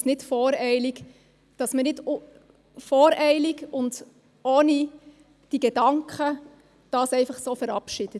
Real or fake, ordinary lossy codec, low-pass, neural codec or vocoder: real; none; none; none